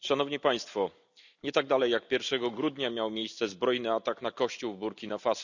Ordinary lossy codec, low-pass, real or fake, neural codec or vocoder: none; 7.2 kHz; real; none